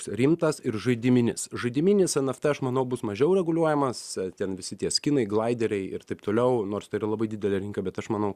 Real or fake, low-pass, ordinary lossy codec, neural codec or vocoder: real; 14.4 kHz; Opus, 64 kbps; none